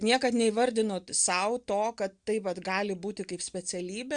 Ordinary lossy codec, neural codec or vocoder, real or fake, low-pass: Opus, 64 kbps; none; real; 9.9 kHz